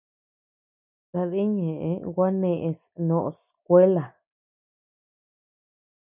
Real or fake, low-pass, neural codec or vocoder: real; 3.6 kHz; none